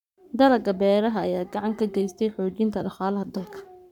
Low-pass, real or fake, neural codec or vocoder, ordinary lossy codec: 19.8 kHz; fake; codec, 44.1 kHz, 7.8 kbps, Pupu-Codec; none